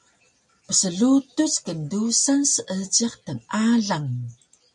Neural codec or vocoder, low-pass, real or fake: none; 10.8 kHz; real